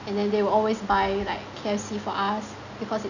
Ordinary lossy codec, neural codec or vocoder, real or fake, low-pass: none; none; real; 7.2 kHz